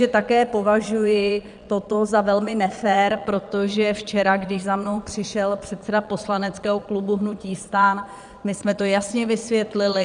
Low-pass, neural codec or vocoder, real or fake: 9.9 kHz; vocoder, 22.05 kHz, 80 mel bands, Vocos; fake